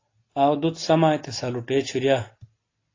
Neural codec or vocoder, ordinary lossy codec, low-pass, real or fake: none; AAC, 32 kbps; 7.2 kHz; real